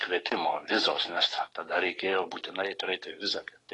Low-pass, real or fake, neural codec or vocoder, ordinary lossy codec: 10.8 kHz; fake; codec, 44.1 kHz, 7.8 kbps, DAC; AAC, 32 kbps